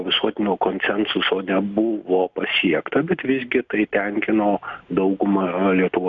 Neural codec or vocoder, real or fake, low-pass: none; real; 7.2 kHz